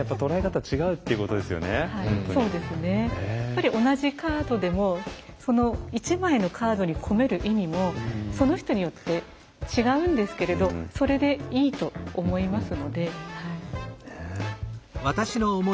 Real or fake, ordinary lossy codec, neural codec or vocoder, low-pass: real; none; none; none